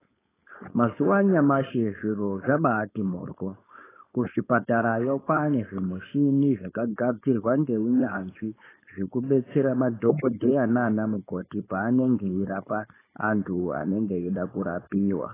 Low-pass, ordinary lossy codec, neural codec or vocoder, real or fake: 3.6 kHz; AAC, 16 kbps; codec, 16 kHz, 4.8 kbps, FACodec; fake